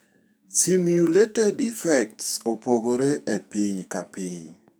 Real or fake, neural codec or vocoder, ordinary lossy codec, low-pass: fake; codec, 44.1 kHz, 2.6 kbps, SNAC; none; none